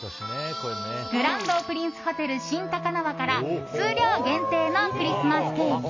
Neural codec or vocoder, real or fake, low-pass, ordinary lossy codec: none; real; 7.2 kHz; MP3, 32 kbps